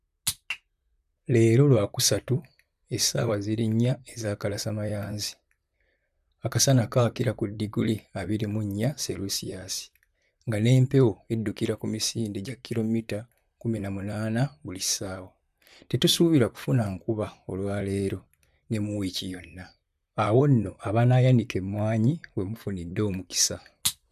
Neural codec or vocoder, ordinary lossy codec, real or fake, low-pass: vocoder, 44.1 kHz, 128 mel bands, Pupu-Vocoder; none; fake; 14.4 kHz